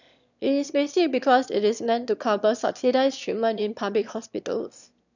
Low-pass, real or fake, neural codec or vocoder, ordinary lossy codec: 7.2 kHz; fake; autoencoder, 22.05 kHz, a latent of 192 numbers a frame, VITS, trained on one speaker; none